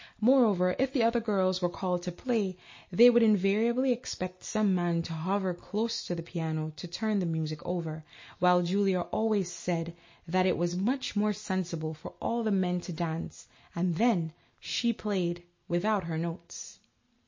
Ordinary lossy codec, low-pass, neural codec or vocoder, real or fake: MP3, 32 kbps; 7.2 kHz; none; real